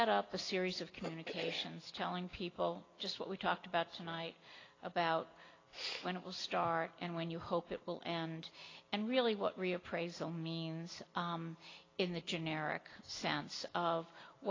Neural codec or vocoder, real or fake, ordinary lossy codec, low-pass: none; real; AAC, 32 kbps; 7.2 kHz